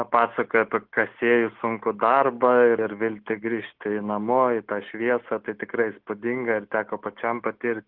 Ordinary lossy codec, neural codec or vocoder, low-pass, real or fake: Opus, 16 kbps; none; 5.4 kHz; real